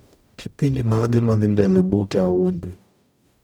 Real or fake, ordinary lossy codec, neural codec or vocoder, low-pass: fake; none; codec, 44.1 kHz, 0.9 kbps, DAC; none